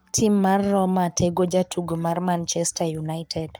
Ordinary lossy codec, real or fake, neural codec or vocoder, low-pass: none; fake; codec, 44.1 kHz, 7.8 kbps, Pupu-Codec; none